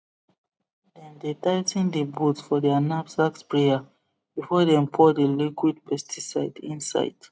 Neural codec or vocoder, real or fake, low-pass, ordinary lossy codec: none; real; none; none